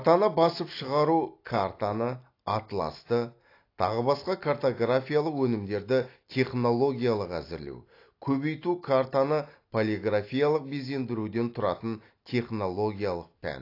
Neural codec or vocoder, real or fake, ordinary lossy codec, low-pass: none; real; AAC, 32 kbps; 5.4 kHz